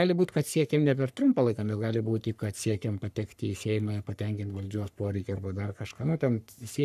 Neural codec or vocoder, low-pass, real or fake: codec, 44.1 kHz, 3.4 kbps, Pupu-Codec; 14.4 kHz; fake